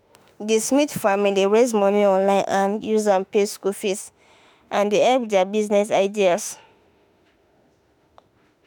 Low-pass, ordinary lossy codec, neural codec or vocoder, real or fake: none; none; autoencoder, 48 kHz, 32 numbers a frame, DAC-VAE, trained on Japanese speech; fake